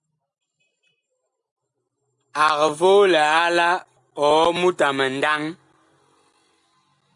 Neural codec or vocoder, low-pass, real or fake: none; 10.8 kHz; real